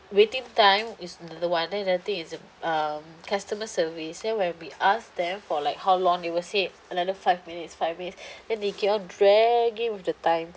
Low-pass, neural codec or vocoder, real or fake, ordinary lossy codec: none; none; real; none